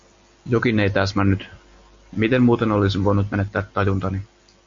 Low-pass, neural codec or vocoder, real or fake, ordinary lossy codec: 7.2 kHz; none; real; MP3, 48 kbps